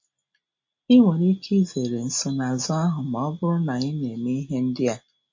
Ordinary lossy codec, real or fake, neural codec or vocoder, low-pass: MP3, 32 kbps; real; none; 7.2 kHz